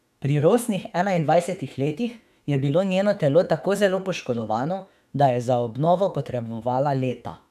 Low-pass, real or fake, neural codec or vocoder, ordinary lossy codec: 14.4 kHz; fake; autoencoder, 48 kHz, 32 numbers a frame, DAC-VAE, trained on Japanese speech; none